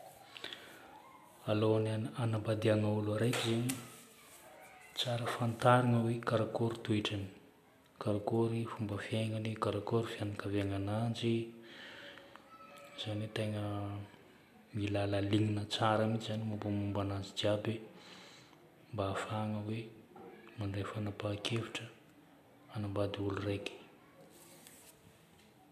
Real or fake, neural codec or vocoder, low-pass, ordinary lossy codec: real; none; 14.4 kHz; none